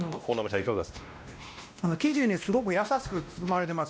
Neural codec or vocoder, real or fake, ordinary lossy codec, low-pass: codec, 16 kHz, 1 kbps, X-Codec, WavLM features, trained on Multilingual LibriSpeech; fake; none; none